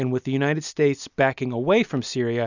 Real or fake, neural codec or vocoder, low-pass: real; none; 7.2 kHz